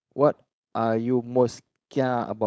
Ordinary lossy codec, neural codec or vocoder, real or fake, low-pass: none; codec, 16 kHz, 4.8 kbps, FACodec; fake; none